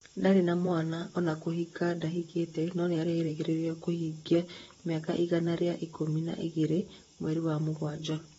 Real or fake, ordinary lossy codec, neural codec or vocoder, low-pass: fake; AAC, 24 kbps; vocoder, 44.1 kHz, 128 mel bands, Pupu-Vocoder; 19.8 kHz